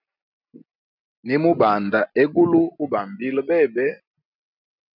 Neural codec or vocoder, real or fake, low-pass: none; real; 5.4 kHz